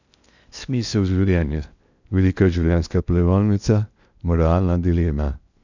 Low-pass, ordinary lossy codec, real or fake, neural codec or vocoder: 7.2 kHz; none; fake; codec, 16 kHz in and 24 kHz out, 0.6 kbps, FocalCodec, streaming, 2048 codes